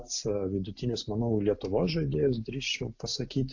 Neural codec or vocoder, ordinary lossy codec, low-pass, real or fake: none; AAC, 48 kbps; 7.2 kHz; real